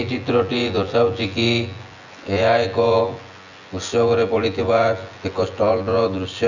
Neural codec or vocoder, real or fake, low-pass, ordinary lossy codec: vocoder, 24 kHz, 100 mel bands, Vocos; fake; 7.2 kHz; none